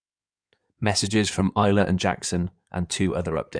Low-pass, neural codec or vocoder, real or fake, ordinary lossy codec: 9.9 kHz; codec, 16 kHz in and 24 kHz out, 2.2 kbps, FireRedTTS-2 codec; fake; none